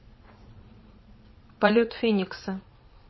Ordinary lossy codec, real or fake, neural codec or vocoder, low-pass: MP3, 24 kbps; fake; vocoder, 22.05 kHz, 80 mel bands, Vocos; 7.2 kHz